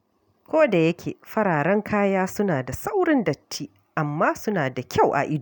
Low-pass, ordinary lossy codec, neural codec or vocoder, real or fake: none; none; none; real